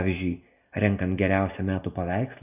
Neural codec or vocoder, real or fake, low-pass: none; real; 3.6 kHz